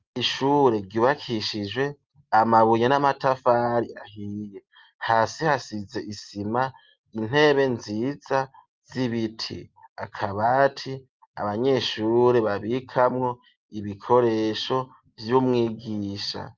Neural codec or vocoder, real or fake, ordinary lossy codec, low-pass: none; real; Opus, 24 kbps; 7.2 kHz